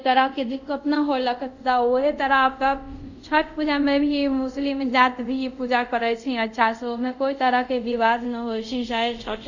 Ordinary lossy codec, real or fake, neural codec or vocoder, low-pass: none; fake; codec, 24 kHz, 0.5 kbps, DualCodec; 7.2 kHz